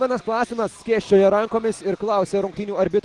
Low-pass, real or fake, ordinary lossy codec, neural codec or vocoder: 10.8 kHz; real; Opus, 24 kbps; none